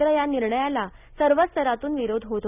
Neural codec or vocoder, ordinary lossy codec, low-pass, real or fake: none; none; 3.6 kHz; real